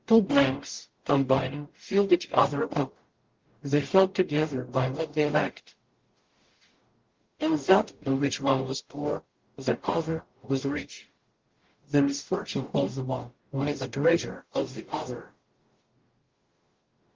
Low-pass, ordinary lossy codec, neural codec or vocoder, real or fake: 7.2 kHz; Opus, 16 kbps; codec, 44.1 kHz, 0.9 kbps, DAC; fake